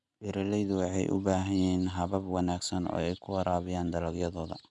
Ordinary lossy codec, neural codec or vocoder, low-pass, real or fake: none; none; 10.8 kHz; real